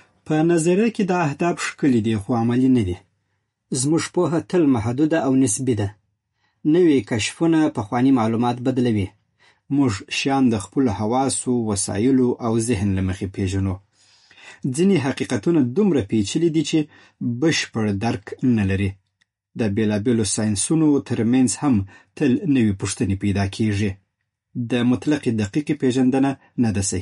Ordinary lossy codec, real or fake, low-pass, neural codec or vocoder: MP3, 48 kbps; real; 19.8 kHz; none